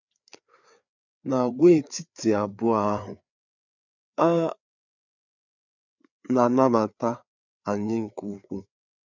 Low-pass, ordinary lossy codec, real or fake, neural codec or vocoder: 7.2 kHz; none; fake; codec, 16 kHz, 4 kbps, FreqCodec, larger model